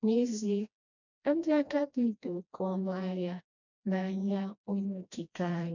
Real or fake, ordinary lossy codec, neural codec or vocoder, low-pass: fake; none; codec, 16 kHz, 1 kbps, FreqCodec, smaller model; 7.2 kHz